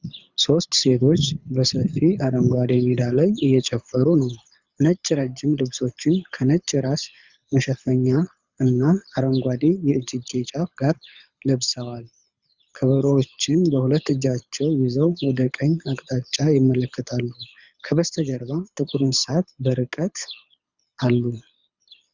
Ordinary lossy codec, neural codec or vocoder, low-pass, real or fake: Opus, 64 kbps; codec, 24 kHz, 6 kbps, HILCodec; 7.2 kHz; fake